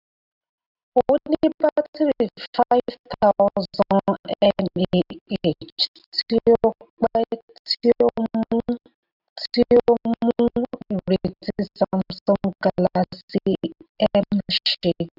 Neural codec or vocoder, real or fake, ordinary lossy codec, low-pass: none; real; AAC, 48 kbps; 5.4 kHz